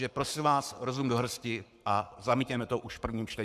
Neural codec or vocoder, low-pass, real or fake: codec, 44.1 kHz, 7.8 kbps, Pupu-Codec; 14.4 kHz; fake